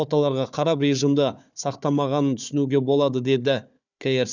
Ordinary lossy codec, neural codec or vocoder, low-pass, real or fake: none; codec, 16 kHz, 4 kbps, FunCodec, trained on Chinese and English, 50 frames a second; 7.2 kHz; fake